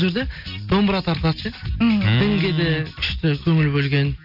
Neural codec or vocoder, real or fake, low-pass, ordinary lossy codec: none; real; 5.4 kHz; none